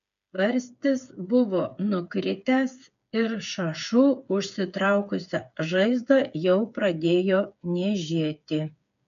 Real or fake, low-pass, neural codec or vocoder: fake; 7.2 kHz; codec, 16 kHz, 8 kbps, FreqCodec, smaller model